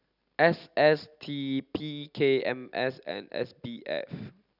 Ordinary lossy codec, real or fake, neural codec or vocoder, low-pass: none; real; none; 5.4 kHz